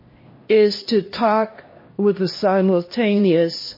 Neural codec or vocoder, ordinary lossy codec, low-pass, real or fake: codec, 16 kHz, 1 kbps, X-Codec, HuBERT features, trained on LibriSpeech; MP3, 24 kbps; 5.4 kHz; fake